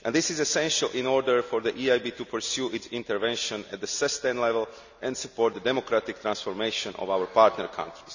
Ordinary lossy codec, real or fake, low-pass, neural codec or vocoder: none; real; 7.2 kHz; none